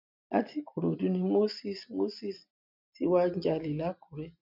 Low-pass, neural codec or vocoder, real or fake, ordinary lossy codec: 5.4 kHz; none; real; none